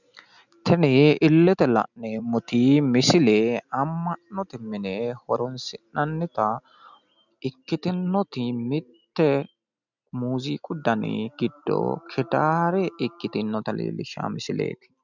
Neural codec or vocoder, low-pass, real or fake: none; 7.2 kHz; real